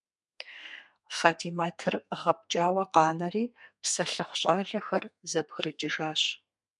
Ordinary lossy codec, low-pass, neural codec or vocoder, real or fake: MP3, 96 kbps; 10.8 kHz; codec, 32 kHz, 1.9 kbps, SNAC; fake